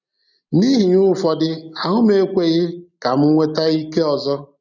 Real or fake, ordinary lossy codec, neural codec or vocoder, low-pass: real; none; none; 7.2 kHz